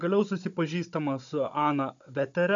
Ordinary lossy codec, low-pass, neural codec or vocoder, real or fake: MP3, 64 kbps; 7.2 kHz; codec, 16 kHz, 16 kbps, FunCodec, trained on Chinese and English, 50 frames a second; fake